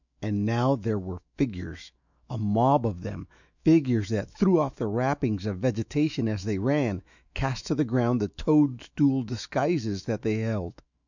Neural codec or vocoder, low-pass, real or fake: autoencoder, 48 kHz, 128 numbers a frame, DAC-VAE, trained on Japanese speech; 7.2 kHz; fake